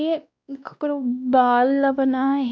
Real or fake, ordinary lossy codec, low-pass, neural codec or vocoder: fake; none; none; codec, 16 kHz, 1 kbps, X-Codec, WavLM features, trained on Multilingual LibriSpeech